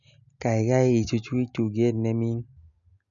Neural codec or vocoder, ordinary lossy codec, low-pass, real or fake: none; none; 7.2 kHz; real